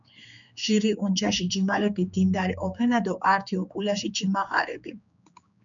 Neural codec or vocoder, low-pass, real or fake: codec, 16 kHz, 4 kbps, X-Codec, HuBERT features, trained on general audio; 7.2 kHz; fake